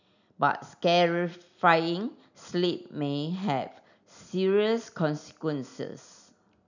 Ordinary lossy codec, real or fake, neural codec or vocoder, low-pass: none; real; none; 7.2 kHz